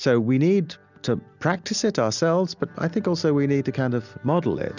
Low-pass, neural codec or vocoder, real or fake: 7.2 kHz; none; real